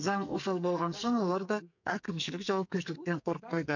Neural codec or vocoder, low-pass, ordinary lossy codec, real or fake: codec, 44.1 kHz, 2.6 kbps, SNAC; 7.2 kHz; none; fake